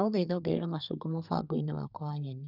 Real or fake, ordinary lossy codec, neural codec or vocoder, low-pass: fake; none; codec, 32 kHz, 1.9 kbps, SNAC; 5.4 kHz